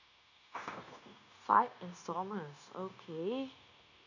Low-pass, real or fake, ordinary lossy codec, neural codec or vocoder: 7.2 kHz; fake; none; codec, 16 kHz, 0.9 kbps, LongCat-Audio-Codec